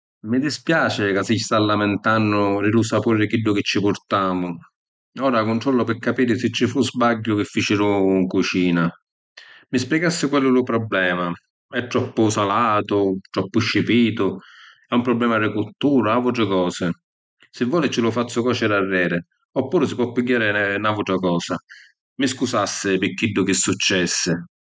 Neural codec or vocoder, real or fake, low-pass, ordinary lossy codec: none; real; none; none